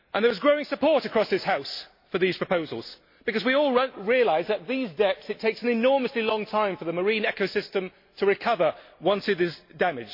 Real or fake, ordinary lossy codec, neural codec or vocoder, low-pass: real; MP3, 32 kbps; none; 5.4 kHz